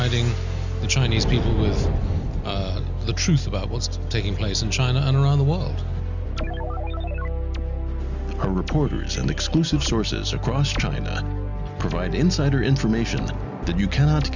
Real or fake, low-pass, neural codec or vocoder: real; 7.2 kHz; none